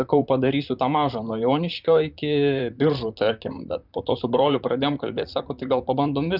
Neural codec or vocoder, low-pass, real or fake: vocoder, 44.1 kHz, 128 mel bands, Pupu-Vocoder; 5.4 kHz; fake